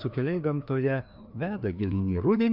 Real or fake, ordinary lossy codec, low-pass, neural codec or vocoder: fake; MP3, 48 kbps; 5.4 kHz; codec, 16 kHz, 2 kbps, FreqCodec, larger model